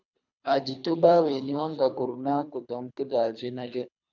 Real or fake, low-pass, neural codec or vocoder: fake; 7.2 kHz; codec, 24 kHz, 3 kbps, HILCodec